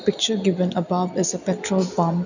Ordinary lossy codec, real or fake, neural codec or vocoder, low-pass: none; real; none; 7.2 kHz